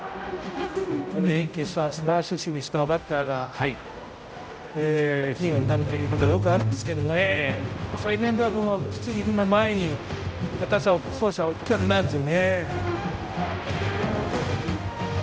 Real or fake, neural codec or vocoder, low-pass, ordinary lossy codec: fake; codec, 16 kHz, 0.5 kbps, X-Codec, HuBERT features, trained on general audio; none; none